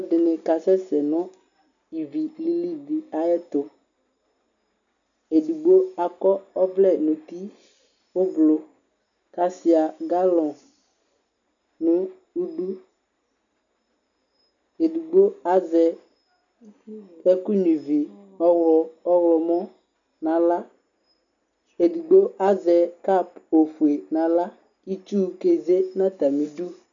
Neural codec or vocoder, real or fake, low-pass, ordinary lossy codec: none; real; 7.2 kHz; MP3, 96 kbps